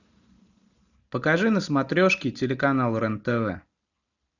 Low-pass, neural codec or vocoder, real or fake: 7.2 kHz; none; real